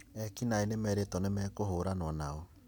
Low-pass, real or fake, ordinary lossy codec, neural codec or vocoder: none; real; none; none